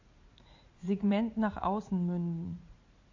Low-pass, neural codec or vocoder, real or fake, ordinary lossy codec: 7.2 kHz; vocoder, 44.1 kHz, 80 mel bands, Vocos; fake; AAC, 48 kbps